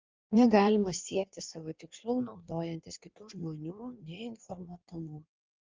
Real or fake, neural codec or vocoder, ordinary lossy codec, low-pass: fake; codec, 16 kHz in and 24 kHz out, 1.1 kbps, FireRedTTS-2 codec; Opus, 32 kbps; 7.2 kHz